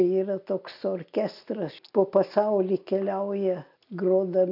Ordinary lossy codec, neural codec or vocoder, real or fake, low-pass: AAC, 48 kbps; none; real; 5.4 kHz